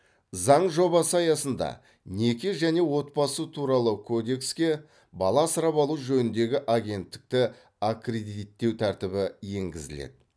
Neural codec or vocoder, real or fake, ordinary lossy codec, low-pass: none; real; none; none